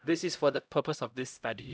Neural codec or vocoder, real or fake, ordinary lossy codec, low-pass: codec, 16 kHz, 0.5 kbps, X-Codec, HuBERT features, trained on LibriSpeech; fake; none; none